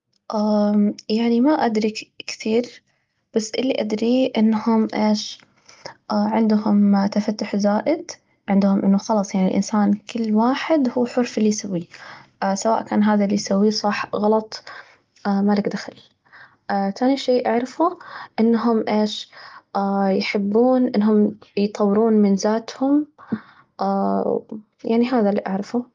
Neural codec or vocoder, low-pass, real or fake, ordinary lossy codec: none; 7.2 kHz; real; Opus, 32 kbps